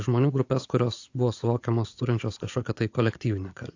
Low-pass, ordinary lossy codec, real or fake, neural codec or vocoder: 7.2 kHz; AAC, 48 kbps; fake; vocoder, 24 kHz, 100 mel bands, Vocos